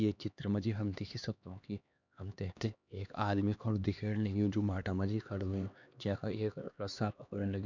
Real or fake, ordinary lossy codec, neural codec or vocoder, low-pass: fake; none; codec, 16 kHz, 2 kbps, X-Codec, HuBERT features, trained on LibriSpeech; 7.2 kHz